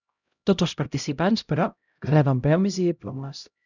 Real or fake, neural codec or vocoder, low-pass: fake; codec, 16 kHz, 0.5 kbps, X-Codec, HuBERT features, trained on LibriSpeech; 7.2 kHz